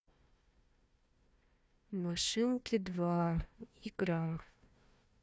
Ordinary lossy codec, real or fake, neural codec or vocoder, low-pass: none; fake; codec, 16 kHz, 1 kbps, FunCodec, trained on Chinese and English, 50 frames a second; none